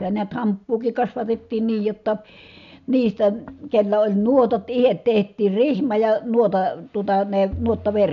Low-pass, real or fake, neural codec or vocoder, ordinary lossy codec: 7.2 kHz; real; none; none